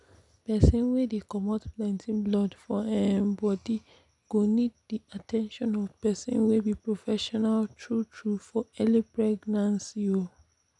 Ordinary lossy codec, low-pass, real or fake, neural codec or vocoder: none; 10.8 kHz; real; none